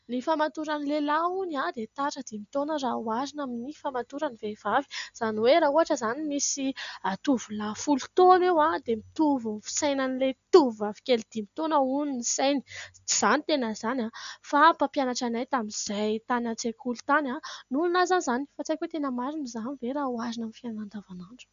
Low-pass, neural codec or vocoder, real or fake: 7.2 kHz; none; real